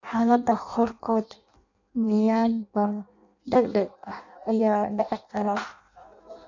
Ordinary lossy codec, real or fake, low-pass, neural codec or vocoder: none; fake; 7.2 kHz; codec, 16 kHz in and 24 kHz out, 0.6 kbps, FireRedTTS-2 codec